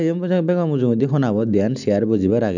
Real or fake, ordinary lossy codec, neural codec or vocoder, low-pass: real; none; none; 7.2 kHz